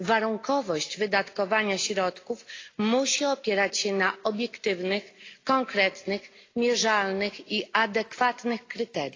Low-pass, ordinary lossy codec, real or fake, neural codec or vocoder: 7.2 kHz; AAC, 32 kbps; real; none